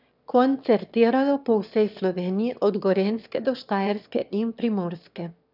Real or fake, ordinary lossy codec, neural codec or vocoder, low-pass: fake; none; autoencoder, 22.05 kHz, a latent of 192 numbers a frame, VITS, trained on one speaker; 5.4 kHz